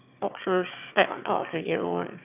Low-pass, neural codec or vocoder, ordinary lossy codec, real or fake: 3.6 kHz; autoencoder, 22.05 kHz, a latent of 192 numbers a frame, VITS, trained on one speaker; none; fake